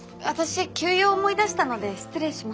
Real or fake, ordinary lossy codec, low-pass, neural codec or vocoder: real; none; none; none